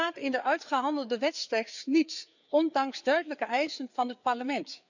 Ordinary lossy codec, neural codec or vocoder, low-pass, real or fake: none; codec, 16 kHz, 4 kbps, FreqCodec, larger model; 7.2 kHz; fake